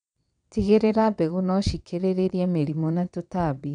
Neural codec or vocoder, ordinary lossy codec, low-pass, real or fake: vocoder, 22.05 kHz, 80 mel bands, WaveNeXt; none; 9.9 kHz; fake